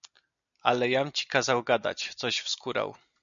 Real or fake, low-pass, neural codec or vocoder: real; 7.2 kHz; none